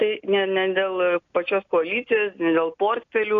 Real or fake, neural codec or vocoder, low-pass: real; none; 7.2 kHz